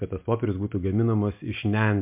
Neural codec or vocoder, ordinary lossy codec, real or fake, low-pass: none; MP3, 32 kbps; real; 3.6 kHz